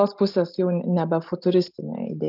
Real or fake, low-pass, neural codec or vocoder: real; 5.4 kHz; none